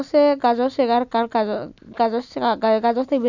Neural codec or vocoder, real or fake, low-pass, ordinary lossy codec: none; real; 7.2 kHz; none